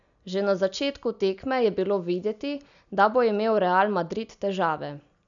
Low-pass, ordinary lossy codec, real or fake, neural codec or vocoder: 7.2 kHz; none; real; none